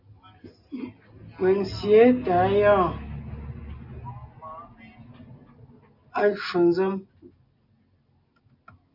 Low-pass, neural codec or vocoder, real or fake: 5.4 kHz; none; real